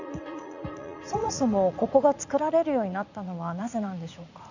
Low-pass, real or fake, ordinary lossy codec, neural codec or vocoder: 7.2 kHz; fake; none; vocoder, 44.1 kHz, 80 mel bands, Vocos